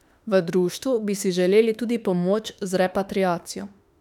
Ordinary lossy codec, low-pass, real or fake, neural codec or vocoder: none; 19.8 kHz; fake; autoencoder, 48 kHz, 32 numbers a frame, DAC-VAE, trained on Japanese speech